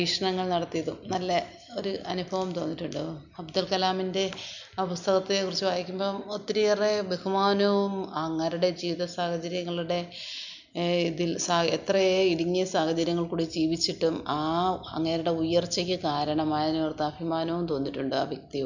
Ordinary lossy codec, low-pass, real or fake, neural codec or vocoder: none; 7.2 kHz; real; none